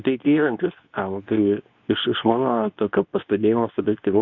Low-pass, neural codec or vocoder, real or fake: 7.2 kHz; codec, 24 kHz, 0.9 kbps, WavTokenizer, medium speech release version 2; fake